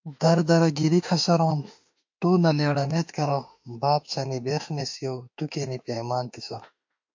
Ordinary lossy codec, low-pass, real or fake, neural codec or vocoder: MP3, 48 kbps; 7.2 kHz; fake; autoencoder, 48 kHz, 32 numbers a frame, DAC-VAE, trained on Japanese speech